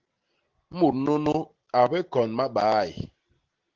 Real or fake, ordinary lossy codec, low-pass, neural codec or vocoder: real; Opus, 16 kbps; 7.2 kHz; none